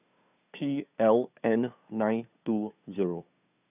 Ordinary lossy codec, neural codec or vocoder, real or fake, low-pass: none; codec, 16 kHz, 2 kbps, FunCodec, trained on Chinese and English, 25 frames a second; fake; 3.6 kHz